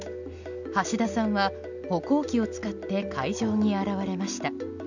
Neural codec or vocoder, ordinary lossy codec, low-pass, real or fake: none; none; 7.2 kHz; real